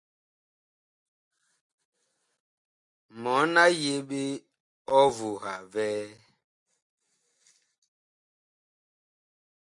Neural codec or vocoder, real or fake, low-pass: none; real; 10.8 kHz